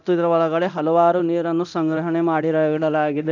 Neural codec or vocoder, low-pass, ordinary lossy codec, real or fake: codec, 24 kHz, 0.9 kbps, DualCodec; 7.2 kHz; none; fake